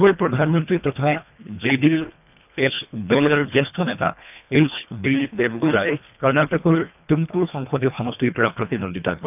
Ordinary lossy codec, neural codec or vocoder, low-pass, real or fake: none; codec, 24 kHz, 1.5 kbps, HILCodec; 3.6 kHz; fake